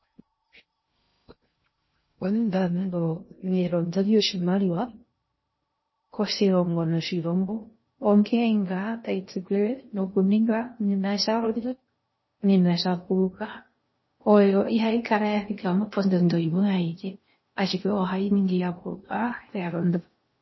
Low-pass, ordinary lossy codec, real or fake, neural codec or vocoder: 7.2 kHz; MP3, 24 kbps; fake; codec, 16 kHz in and 24 kHz out, 0.6 kbps, FocalCodec, streaming, 2048 codes